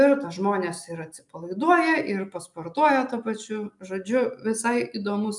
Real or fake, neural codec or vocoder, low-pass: real; none; 10.8 kHz